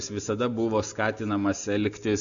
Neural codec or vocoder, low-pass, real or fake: none; 7.2 kHz; real